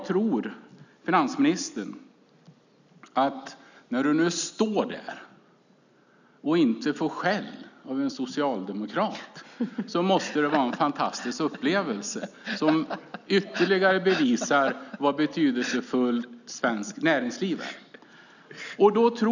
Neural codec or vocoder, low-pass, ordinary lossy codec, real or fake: none; 7.2 kHz; none; real